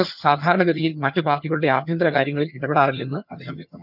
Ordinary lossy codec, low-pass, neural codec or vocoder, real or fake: none; 5.4 kHz; vocoder, 22.05 kHz, 80 mel bands, HiFi-GAN; fake